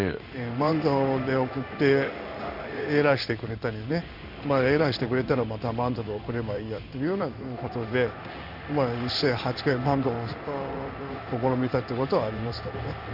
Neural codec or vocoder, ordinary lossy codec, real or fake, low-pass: codec, 16 kHz in and 24 kHz out, 1 kbps, XY-Tokenizer; none; fake; 5.4 kHz